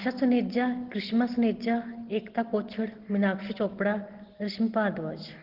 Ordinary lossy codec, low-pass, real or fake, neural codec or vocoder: Opus, 16 kbps; 5.4 kHz; real; none